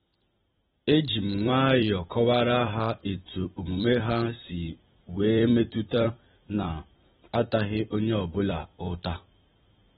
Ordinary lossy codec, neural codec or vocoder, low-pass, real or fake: AAC, 16 kbps; vocoder, 44.1 kHz, 128 mel bands every 256 samples, BigVGAN v2; 19.8 kHz; fake